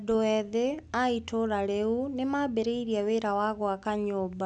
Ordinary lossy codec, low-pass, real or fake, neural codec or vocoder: none; 10.8 kHz; real; none